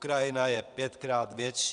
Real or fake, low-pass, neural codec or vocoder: fake; 9.9 kHz; vocoder, 22.05 kHz, 80 mel bands, WaveNeXt